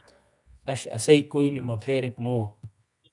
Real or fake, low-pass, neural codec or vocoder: fake; 10.8 kHz; codec, 24 kHz, 0.9 kbps, WavTokenizer, medium music audio release